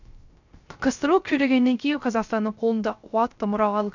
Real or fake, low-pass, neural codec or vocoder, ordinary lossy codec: fake; 7.2 kHz; codec, 16 kHz, 0.3 kbps, FocalCodec; none